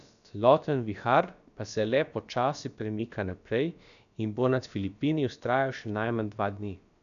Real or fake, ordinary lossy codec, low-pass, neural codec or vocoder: fake; none; 7.2 kHz; codec, 16 kHz, about 1 kbps, DyCAST, with the encoder's durations